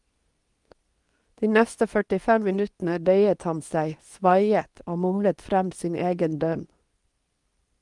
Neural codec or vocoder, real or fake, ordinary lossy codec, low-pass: codec, 24 kHz, 0.9 kbps, WavTokenizer, medium speech release version 2; fake; Opus, 32 kbps; 10.8 kHz